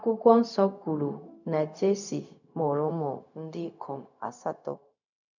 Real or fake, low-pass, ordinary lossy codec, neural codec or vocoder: fake; 7.2 kHz; none; codec, 16 kHz, 0.4 kbps, LongCat-Audio-Codec